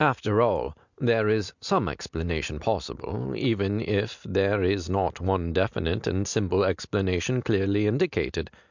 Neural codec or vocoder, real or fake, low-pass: none; real; 7.2 kHz